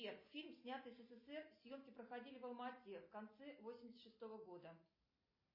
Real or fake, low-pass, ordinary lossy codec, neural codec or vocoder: real; 5.4 kHz; MP3, 24 kbps; none